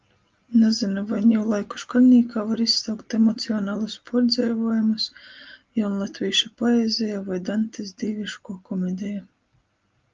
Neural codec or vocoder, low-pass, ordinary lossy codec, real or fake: none; 7.2 kHz; Opus, 32 kbps; real